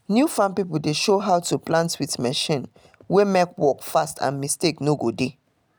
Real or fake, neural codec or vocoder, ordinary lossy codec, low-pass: real; none; none; none